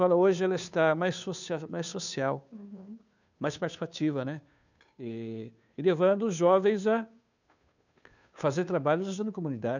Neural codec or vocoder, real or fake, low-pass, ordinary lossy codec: codec, 16 kHz, 2 kbps, FunCodec, trained on Chinese and English, 25 frames a second; fake; 7.2 kHz; none